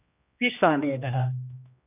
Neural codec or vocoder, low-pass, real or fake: codec, 16 kHz, 1 kbps, X-Codec, HuBERT features, trained on general audio; 3.6 kHz; fake